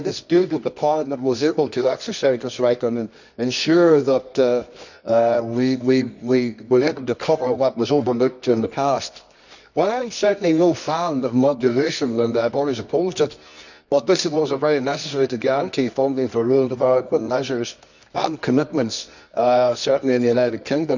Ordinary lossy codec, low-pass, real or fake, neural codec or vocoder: none; 7.2 kHz; fake; codec, 24 kHz, 0.9 kbps, WavTokenizer, medium music audio release